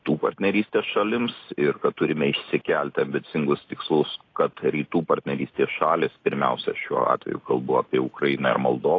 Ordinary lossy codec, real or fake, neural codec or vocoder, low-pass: AAC, 32 kbps; real; none; 7.2 kHz